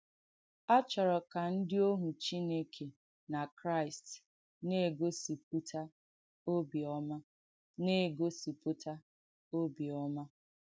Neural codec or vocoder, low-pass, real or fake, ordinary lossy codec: none; none; real; none